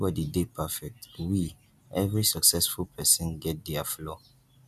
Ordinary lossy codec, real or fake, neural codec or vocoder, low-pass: MP3, 96 kbps; real; none; 14.4 kHz